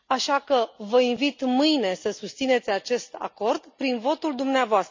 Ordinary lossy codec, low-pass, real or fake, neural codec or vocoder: MP3, 64 kbps; 7.2 kHz; real; none